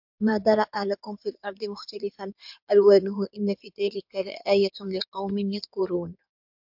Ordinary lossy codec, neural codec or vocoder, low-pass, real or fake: MP3, 48 kbps; codec, 16 kHz in and 24 kHz out, 2.2 kbps, FireRedTTS-2 codec; 5.4 kHz; fake